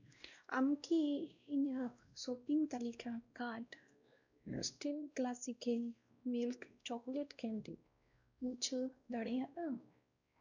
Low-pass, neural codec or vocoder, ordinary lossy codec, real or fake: 7.2 kHz; codec, 16 kHz, 1 kbps, X-Codec, WavLM features, trained on Multilingual LibriSpeech; none; fake